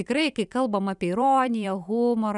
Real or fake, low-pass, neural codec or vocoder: fake; 10.8 kHz; vocoder, 44.1 kHz, 128 mel bands every 512 samples, BigVGAN v2